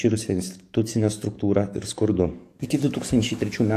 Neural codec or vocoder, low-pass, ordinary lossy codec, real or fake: codec, 44.1 kHz, 7.8 kbps, DAC; 14.4 kHz; AAC, 64 kbps; fake